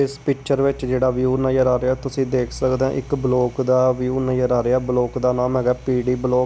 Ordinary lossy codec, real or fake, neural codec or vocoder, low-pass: none; real; none; none